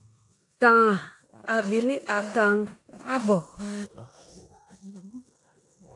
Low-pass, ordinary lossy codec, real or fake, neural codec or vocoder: 10.8 kHz; AAC, 48 kbps; fake; codec, 16 kHz in and 24 kHz out, 0.9 kbps, LongCat-Audio-Codec, four codebook decoder